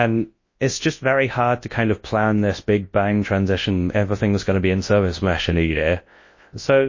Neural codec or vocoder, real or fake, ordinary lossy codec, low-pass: codec, 24 kHz, 0.9 kbps, WavTokenizer, large speech release; fake; MP3, 32 kbps; 7.2 kHz